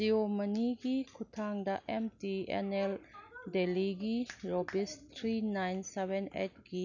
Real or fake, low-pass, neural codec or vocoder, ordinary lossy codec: real; 7.2 kHz; none; none